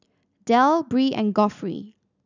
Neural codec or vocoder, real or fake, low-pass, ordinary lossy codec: none; real; 7.2 kHz; none